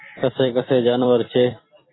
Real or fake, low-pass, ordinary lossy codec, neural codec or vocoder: fake; 7.2 kHz; AAC, 16 kbps; vocoder, 44.1 kHz, 128 mel bands every 256 samples, BigVGAN v2